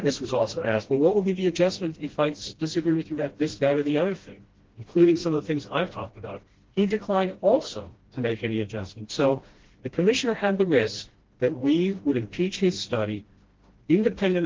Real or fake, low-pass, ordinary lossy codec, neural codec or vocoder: fake; 7.2 kHz; Opus, 16 kbps; codec, 16 kHz, 1 kbps, FreqCodec, smaller model